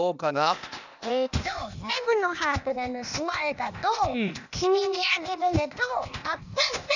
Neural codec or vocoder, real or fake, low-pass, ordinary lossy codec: codec, 16 kHz, 0.8 kbps, ZipCodec; fake; 7.2 kHz; none